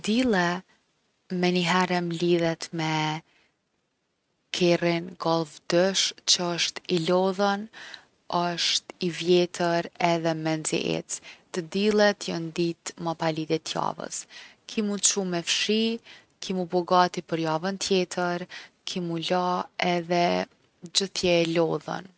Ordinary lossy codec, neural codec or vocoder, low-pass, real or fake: none; none; none; real